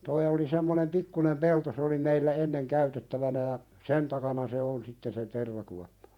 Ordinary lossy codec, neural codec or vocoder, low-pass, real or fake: MP3, 96 kbps; vocoder, 48 kHz, 128 mel bands, Vocos; 19.8 kHz; fake